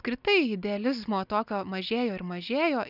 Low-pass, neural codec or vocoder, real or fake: 5.4 kHz; none; real